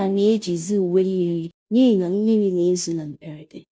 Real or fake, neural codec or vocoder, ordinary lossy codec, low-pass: fake; codec, 16 kHz, 0.5 kbps, FunCodec, trained on Chinese and English, 25 frames a second; none; none